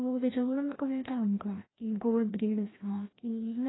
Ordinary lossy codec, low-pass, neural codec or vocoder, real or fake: AAC, 16 kbps; 7.2 kHz; codec, 16 kHz, 1 kbps, FreqCodec, larger model; fake